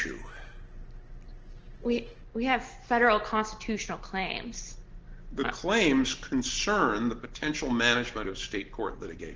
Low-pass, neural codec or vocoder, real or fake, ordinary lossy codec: 7.2 kHz; none; real; Opus, 16 kbps